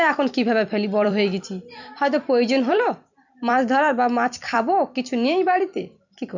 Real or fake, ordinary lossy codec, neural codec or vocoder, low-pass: fake; none; vocoder, 44.1 kHz, 128 mel bands every 256 samples, BigVGAN v2; 7.2 kHz